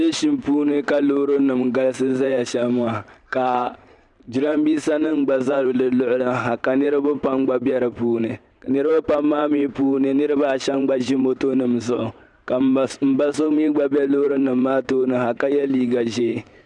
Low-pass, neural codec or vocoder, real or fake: 10.8 kHz; vocoder, 44.1 kHz, 128 mel bands every 512 samples, BigVGAN v2; fake